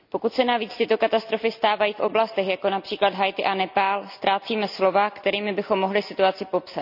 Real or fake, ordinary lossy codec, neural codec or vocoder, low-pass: real; none; none; 5.4 kHz